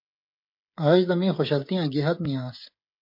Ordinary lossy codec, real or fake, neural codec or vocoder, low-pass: MP3, 32 kbps; fake; codec, 16 kHz, 16 kbps, FreqCodec, smaller model; 5.4 kHz